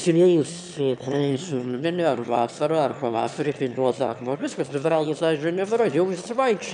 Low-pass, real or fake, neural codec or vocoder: 9.9 kHz; fake; autoencoder, 22.05 kHz, a latent of 192 numbers a frame, VITS, trained on one speaker